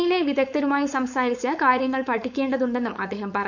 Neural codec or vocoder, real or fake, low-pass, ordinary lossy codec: codec, 16 kHz, 4.8 kbps, FACodec; fake; 7.2 kHz; none